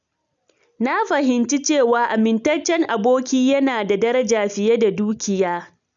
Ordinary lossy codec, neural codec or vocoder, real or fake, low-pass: none; none; real; 7.2 kHz